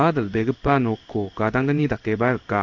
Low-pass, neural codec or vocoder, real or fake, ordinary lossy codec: 7.2 kHz; codec, 16 kHz in and 24 kHz out, 1 kbps, XY-Tokenizer; fake; AAC, 48 kbps